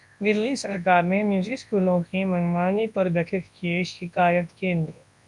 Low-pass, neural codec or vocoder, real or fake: 10.8 kHz; codec, 24 kHz, 0.9 kbps, WavTokenizer, large speech release; fake